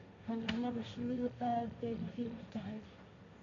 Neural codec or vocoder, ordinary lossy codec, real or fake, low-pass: codec, 16 kHz, 1.1 kbps, Voila-Tokenizer; MP3, 64 kbps; fake; 7.2 kHz